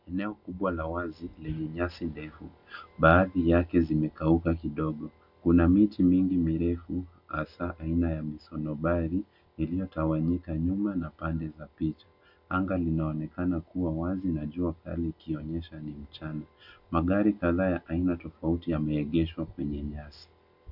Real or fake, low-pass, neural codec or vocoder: real; 5.4 kHz; none